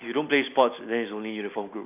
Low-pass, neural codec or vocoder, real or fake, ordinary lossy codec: 3.6 kHz; none; real; AAC, 32 kbps